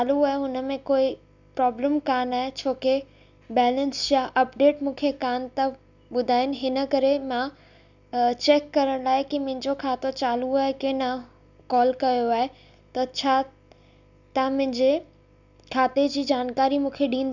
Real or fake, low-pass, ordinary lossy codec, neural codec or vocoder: real; 7.2 kHz; none; none